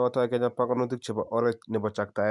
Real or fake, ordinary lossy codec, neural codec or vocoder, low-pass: real; none; none; 10.8 kHz